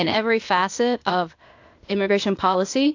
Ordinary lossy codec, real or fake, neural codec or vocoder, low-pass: AAC, 48 kbps; fake; codec, 16 kHz, 0.8 kbps, ZipCodec; 7.2 kHz